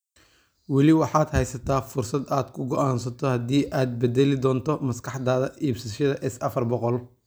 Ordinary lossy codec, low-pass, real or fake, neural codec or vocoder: none; none; real; none